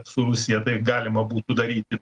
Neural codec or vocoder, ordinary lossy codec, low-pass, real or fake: none; Opus, 24 kbps; 10.8 kHz; real